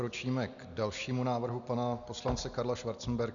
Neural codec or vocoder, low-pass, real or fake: none; 7.2 kHz; real